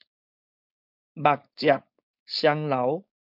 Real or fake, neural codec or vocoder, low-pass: fake; codec, 16 kHz, 4.8 kbps, FACodec; 5.4 kHz